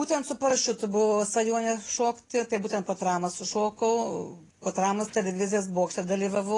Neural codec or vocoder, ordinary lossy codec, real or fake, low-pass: none; AAC, 32 kbps; real; 10.8 kHz